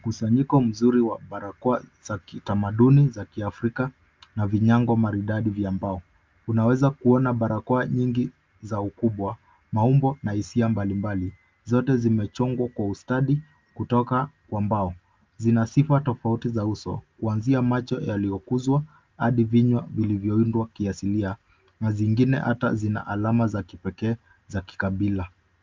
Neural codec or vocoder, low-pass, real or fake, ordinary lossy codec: none; 7.2 kHz; real; Opus, 32 kbps